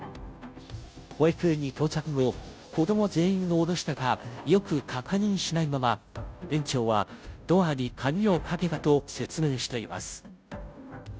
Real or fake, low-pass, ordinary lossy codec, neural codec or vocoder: fake; none; none; codec, 16 kHz, 0.5 kbps, FunCodec, trained on Chinese and English, 25 frames a second